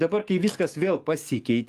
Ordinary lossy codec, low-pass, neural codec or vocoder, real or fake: Opus, 32 kbps; 14.4 kHz; codec, 44.1 kHz, 7.8 kbps, DAC; fake